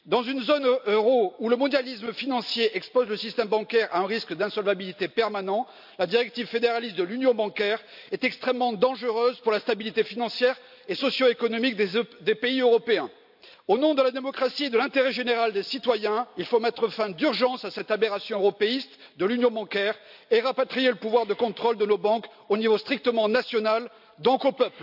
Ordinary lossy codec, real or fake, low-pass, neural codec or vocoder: AAC, 48 kbps; real; 5.4 kHz; none